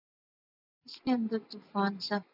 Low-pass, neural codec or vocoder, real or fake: 5.4 kHz; vocoder, 44.1 kHz, 128 mel bands every 512 samples, BigVGAN v2; fake